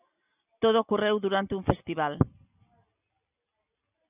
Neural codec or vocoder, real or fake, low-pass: none; real; 3.6 kHz